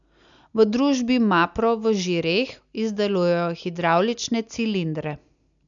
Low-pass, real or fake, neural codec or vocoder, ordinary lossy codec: 7.2 kHz; real; none; none